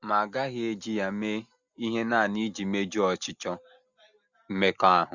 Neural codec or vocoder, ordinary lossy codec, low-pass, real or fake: none; Opus, 64 kbps; 7.2 kHz; real